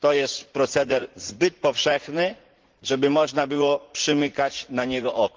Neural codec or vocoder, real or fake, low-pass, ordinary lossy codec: vocoder, 44.1 kHz, 80 mel bands, Vocos; fake; 7.2 kHz; Opus, 16 kbps